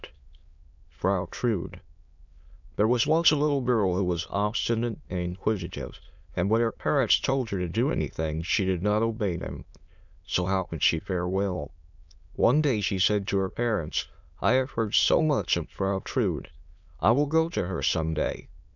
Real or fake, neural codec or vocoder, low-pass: fake; autoencoder, 22.05 kHz, a latent of 192 numbers a frame, VITS, trained on many speakers; 7.2 kHz